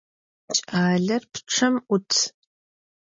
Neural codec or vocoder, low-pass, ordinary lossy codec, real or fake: none; 7.2 kHz; MP3, 32 kbps; real